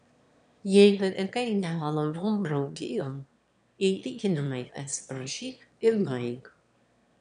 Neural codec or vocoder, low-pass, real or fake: autoencoder, 22.05 kHz, a latent of 192 numbers a frame, VITS, trained on one speaker; 9.9 kHz; fake